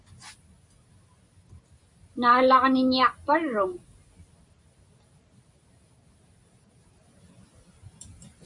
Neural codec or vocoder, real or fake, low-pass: none; real; 10.8 kHz